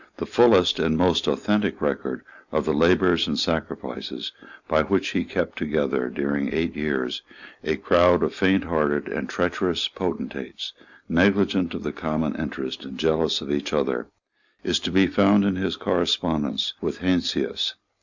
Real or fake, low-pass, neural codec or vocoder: real; 7.2 kHz; none